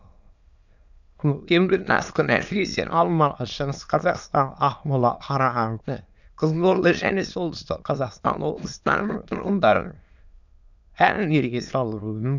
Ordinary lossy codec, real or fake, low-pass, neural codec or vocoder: none; fake; 7.2 kHz; autoencoder, 22.05 kHz, a latent of 192 numbers a frame, VITS, trained on many speakers